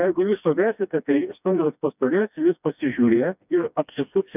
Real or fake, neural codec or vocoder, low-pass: fake; codec, 16 kHz, 1 kbps, FreqCodec, smaller model; 3.6 kHz